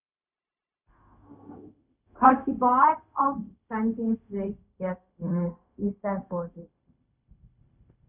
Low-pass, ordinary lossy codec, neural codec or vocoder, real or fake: 3.6 kHz; none; codec, 16 kHz, 0.4 kbps, LongCat-Audio-Codec; fake